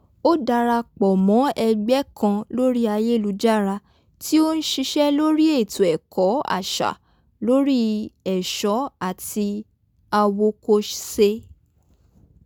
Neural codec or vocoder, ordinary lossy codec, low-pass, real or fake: none; none; none; real